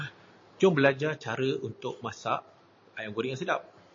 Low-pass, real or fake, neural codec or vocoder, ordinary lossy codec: 7.2 kHz; real; none; MP3, 32 kbps